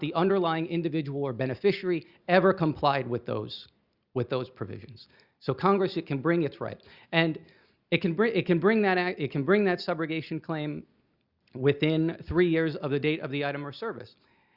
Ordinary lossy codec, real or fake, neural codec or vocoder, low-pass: Opus, 64 kbps; real; none; 5.4 kHz